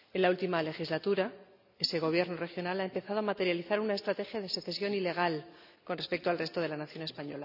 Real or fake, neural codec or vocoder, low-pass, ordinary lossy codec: real; none; 5.4 kHz; none